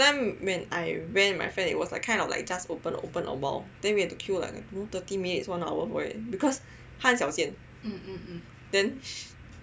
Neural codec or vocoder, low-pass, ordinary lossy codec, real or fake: none; none; none; real